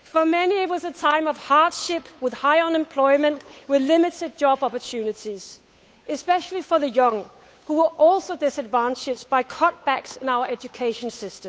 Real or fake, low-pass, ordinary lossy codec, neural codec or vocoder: fake; none; none; codec, 16 kHz, 8 kbps, FunCodec, trained on Chinese and English, 25 frames a second